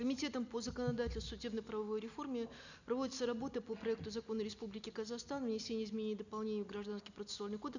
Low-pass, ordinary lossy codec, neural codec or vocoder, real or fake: 7.2 kHz; none; none; real